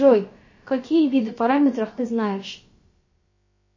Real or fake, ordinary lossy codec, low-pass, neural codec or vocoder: fake; MP3, 32 kbps; 7.2 kHz; codec, 16 kHz, about 1 kbps, DyCAST, with the encoder's durations